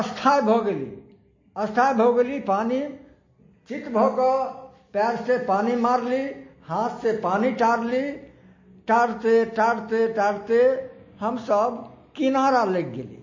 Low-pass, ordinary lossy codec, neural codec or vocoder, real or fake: 7.2 kHz; MP3, 32 kbps; none; real